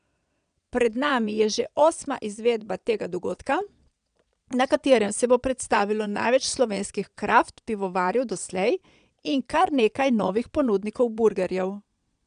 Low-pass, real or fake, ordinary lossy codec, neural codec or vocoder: 9.9 kHz; fake; none; vocoder, 22.05 kHz, 80 mel bands, Vocos